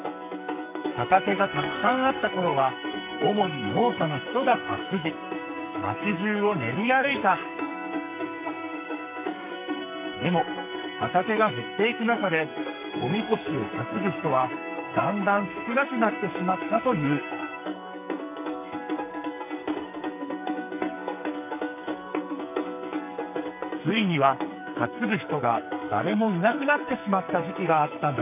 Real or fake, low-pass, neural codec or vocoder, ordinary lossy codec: fake; 3.6 kHz; codec, 44.1 kHz, 2.6 kbps, SNAC; none